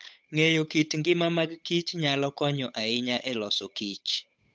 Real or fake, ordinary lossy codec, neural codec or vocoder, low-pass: fake; none; codec, 16 kHz, 8 kbps, FunCodec, trained on Chinese and English, 25 frames a second; none